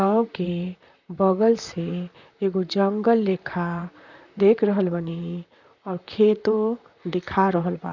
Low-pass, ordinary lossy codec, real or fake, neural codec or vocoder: 7.2 kHz; none; fake; vocoder, 44.1 kHz, 128 mel bands, Pupu-Vocoder